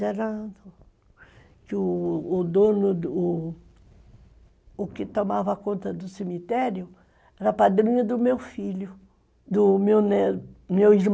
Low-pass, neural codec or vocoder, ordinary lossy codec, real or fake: none; none; none; real